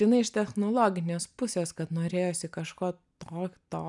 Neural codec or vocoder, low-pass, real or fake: none; 10.8 kHz; real